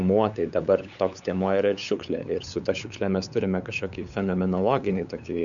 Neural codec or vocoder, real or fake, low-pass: codec, 16 kHz, 8 kbps, FunCodec, trained on LibriTTS, 25 frames a second; fake; 7.2 kHz